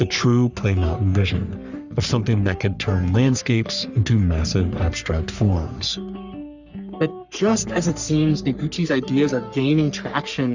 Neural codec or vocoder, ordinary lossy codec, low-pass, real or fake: codec, 44.1 kHz, 3.4 kbps, Pupu-Codec; Opus, 64 kbps; 7.2 kHz; fake